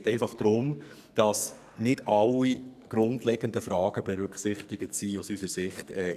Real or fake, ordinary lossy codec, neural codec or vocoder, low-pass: fake; none; codec, 44.1 kHz, 2.6 kbps, SNAC; 14.4 kHz